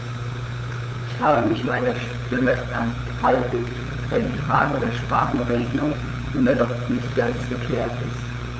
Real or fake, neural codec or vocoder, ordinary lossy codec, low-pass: fake; codec, 16 kHz, 8 kbps, FunCodec, trained on LibriTTS, 25 frames a second; none; none